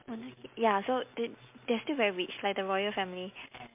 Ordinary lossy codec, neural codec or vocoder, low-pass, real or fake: MP3, 32 kbps; none; 3.6 kHz; real